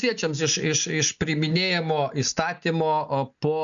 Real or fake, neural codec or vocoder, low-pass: real; none; 7.2 kHz